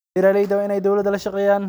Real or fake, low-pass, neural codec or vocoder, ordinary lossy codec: real; none; none; none